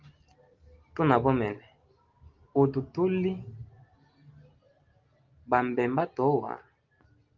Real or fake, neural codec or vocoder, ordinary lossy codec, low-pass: real; none; Opus, 32 kbps; 7.2 kHz